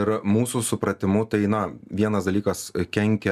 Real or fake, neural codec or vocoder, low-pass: real; none; 14.4 kHz